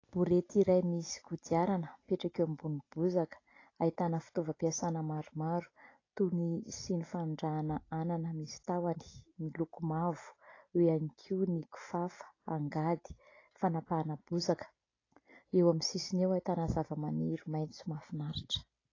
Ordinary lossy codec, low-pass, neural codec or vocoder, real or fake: AAC, 32 kbps; 7.2 kHz; none; real